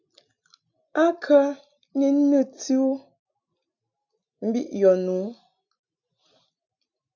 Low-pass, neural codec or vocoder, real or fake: 7.2 kHz; none; real